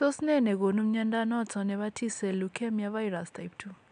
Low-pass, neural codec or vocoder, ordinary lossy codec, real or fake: 9.9 kHz; none; AAC, 96 kbps; real